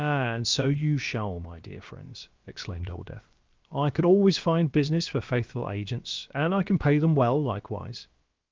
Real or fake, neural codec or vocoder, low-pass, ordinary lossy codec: fake; codec, 16 kHz, about 1 kbps, DyCAST, with the encoder's durations; 7.2 kHz; Opus, 32 kbps